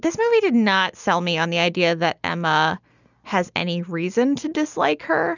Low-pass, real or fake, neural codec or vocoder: 7.2 kHz; real; none